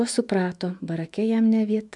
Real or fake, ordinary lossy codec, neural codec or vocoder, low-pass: fake; MP3, 96 kbps; autoencoder, 48 kHz, 128 numbers a frame, DAC-VAE, trained on Japanese speech; 10.8 kHz